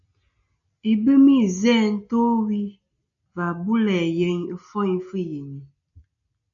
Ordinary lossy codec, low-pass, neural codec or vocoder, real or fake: MP3, 96 kbps; 7.2 kHz; none; real